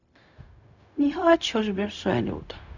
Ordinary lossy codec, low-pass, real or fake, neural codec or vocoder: none; 7.2 kHz; fake; codec, 16 kHz, 0.4 kbps, LongCat-Audio-Codec